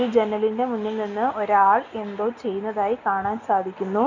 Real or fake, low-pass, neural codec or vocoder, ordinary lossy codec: real; 7.2 kHz; none; none